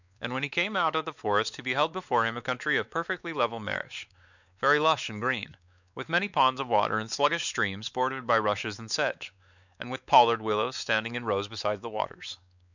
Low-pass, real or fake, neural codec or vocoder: 7.2 kHz; fake; codec, 16 kHz, 4 kbps, X-Codec, WavLM features, trained on Multilingual LibriSpeech